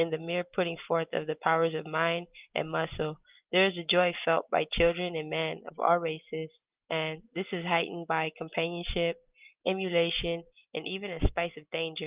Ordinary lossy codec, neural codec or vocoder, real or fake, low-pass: Opus, 32 kbps; none; real; 3.6 kHz